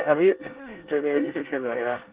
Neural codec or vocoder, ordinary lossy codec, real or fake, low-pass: codec, 24 kHz, 1 kbps, SNAC; Opus, 16 kbps; fake; 3.6 kHz